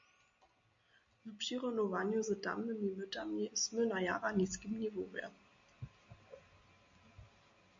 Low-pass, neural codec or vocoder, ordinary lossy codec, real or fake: 7.2 kHz; none; MP3, 48 kbps; real